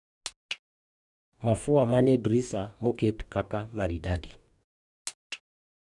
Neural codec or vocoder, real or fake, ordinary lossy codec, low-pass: codec, 44.1 kHz, 2.6 kbps, DAC; fake; none; 10.8 kHz